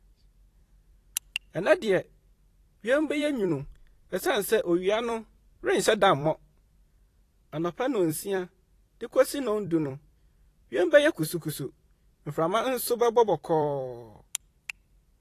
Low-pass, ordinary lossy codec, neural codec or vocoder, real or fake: 14.4 kHz; AAC, 48 kbps; vocoder, 44.1 kHz, 128 mel bands every 256 samples, BigVGAN v2; fake